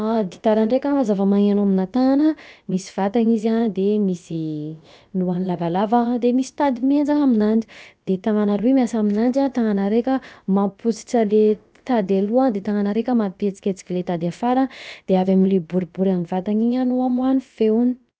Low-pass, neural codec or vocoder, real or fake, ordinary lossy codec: none; codec, 16 kHz, about 1 kbps, DyCAST, with the encoder's durations; fake; none